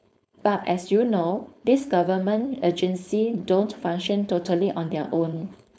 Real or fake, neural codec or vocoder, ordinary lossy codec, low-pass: fake; codec, 16 kHz, 4.8 kbps, FACodec; none; none